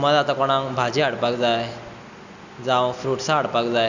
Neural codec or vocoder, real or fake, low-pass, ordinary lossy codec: none; real; 7.2 kHz; none